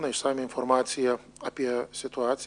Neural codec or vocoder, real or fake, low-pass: none; real; 9.9 kHz